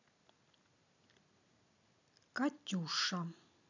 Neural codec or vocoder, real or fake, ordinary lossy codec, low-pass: none; real; none; 7.2 kHz